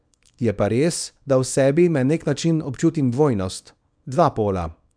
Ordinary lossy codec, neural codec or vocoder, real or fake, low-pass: none; codec, 24 kHz, 0.9 kbps, WavTokenizer, small release; fake; 9.9 kHz